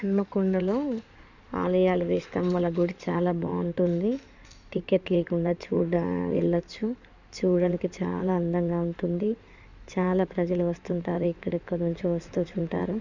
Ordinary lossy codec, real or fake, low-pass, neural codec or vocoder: none; fake; 7.2 kHz; codec, 16 kHz in and 24 kHz out, 2.2 kbps, FireRedTTS-2 codec